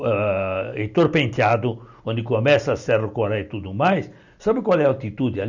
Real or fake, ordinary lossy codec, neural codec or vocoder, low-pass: real; none; none; 7.2 kHz